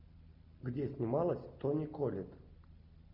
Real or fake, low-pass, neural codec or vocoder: real; 5.4 kHz; none